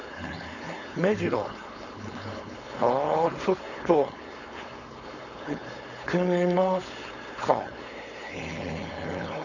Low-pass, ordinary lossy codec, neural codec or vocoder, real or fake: 7.2 kHz; none; codec, 16 kHz, 4.8 kbps, FACodec; fake